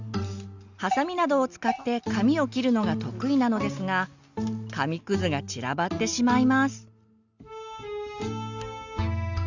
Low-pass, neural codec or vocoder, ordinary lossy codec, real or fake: 7.2 kHz; none; Opus, 64 kbps; real